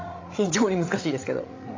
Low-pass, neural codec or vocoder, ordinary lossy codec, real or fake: 7.2 kHz; codec, 16 kHz, 16 kbps, FreqCodec, larger model; AAC, 32 kbps; fake